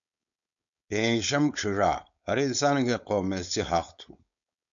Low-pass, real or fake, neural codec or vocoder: 7.2 kHz; fake; codec, 16 kHz, 4.8 kbps, FACodec